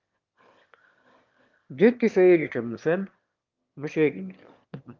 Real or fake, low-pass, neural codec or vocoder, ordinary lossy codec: fake; 7.2 kHz; autoencoder, 22.05 kHz, a latent of 192 numbers a frame, VITS, trained on one speaker; Opus, 32 kbps